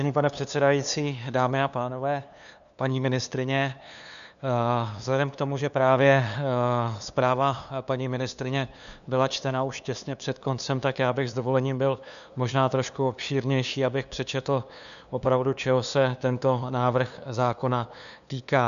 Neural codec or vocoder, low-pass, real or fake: codec, 16 kHz, 2 kbps, FunCodec, trained on LibriTTS, 25 frames a second; 7.2 kHz; fake